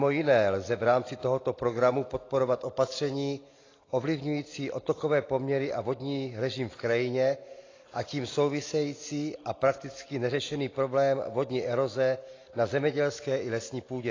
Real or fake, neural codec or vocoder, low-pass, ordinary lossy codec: real; none; 7.2 kHz; AAC, 32 kbps